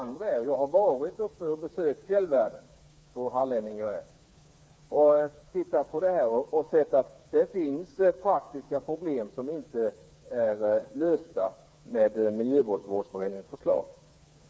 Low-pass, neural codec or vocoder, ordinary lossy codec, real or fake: none; codec, 16 kHz, 4 kbps, FreqCodec, smaller model; none; fake